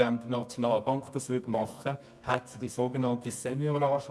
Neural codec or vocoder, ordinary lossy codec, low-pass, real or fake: codec, 24 kHz, 0.9 kbps, WavTokenizer, medium music audio release; none; none; fake